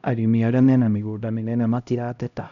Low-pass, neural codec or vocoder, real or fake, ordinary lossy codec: 7.2 kHz; codec, 16 kHz, 0.5 kbps, X-Codec, HuBERT features, trained on LibriSpeech; fake; none